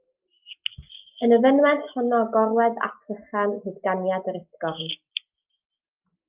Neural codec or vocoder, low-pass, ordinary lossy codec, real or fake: none; 3.6 kHz; Opus, 24 kbps; real